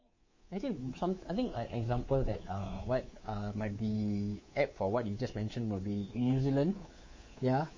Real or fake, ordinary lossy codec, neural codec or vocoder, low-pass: fake; MP3, 32 kbps; codec, 24 kHz, 3.1 kbps, DualCodec; 7.2 kHz